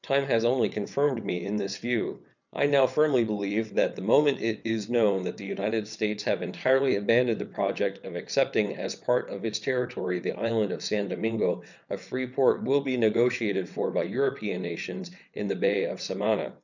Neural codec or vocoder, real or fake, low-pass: vocoder, 22.05 kHz, 80 mel bands, WaveNeXt; fake; 7.2 kHz